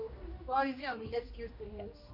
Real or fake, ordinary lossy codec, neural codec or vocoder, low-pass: fake; MP3, 32 kbps; codec, 16 kHz, 1 kbps, X-Codec, HuBERT features, trained on general audio; 5.4 kHz